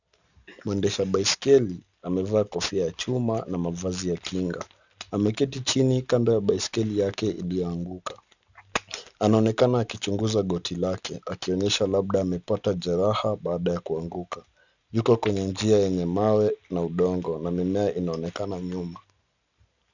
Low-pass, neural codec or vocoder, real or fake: 7.2 kHz; none; real